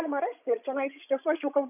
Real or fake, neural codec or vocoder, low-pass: fake; codec, 16 kHz, 16 kbps, FreqCodec, larger model; 3.6 kHz